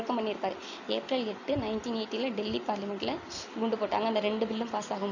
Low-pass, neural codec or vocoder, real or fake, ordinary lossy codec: 7.2 kHz; none; real; none